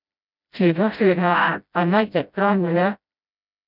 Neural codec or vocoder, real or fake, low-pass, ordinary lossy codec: codec, 16 kHz, 0.5 kbps, FreqCodec, smaller model; fake; 5.4 kHz; none